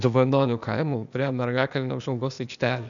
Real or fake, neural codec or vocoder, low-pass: fake; codec, 16 kHz, 0.8 kbps, ZipCodec; 7.2 kHz